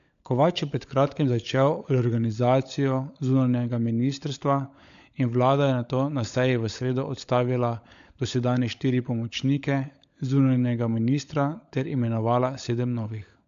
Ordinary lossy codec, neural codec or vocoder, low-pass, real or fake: AAC, 64 kbps; codec, 16 kHz, 16 kbps, FunCodec, trained on LibriTTS, 50 frames a second; 7.2 kHz; fake